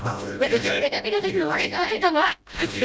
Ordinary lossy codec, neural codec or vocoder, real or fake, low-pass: none; codec, 16 kHz, 0.5 kbps, FreqCodec, smaller model; fake; none